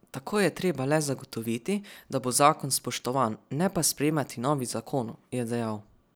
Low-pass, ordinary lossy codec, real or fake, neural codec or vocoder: none; none; real; none